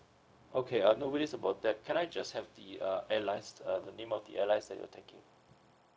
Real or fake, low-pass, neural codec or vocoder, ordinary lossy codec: fake; none; codec, 16 kHz, 0.4 kbps, LongCat-Audio-Codec; none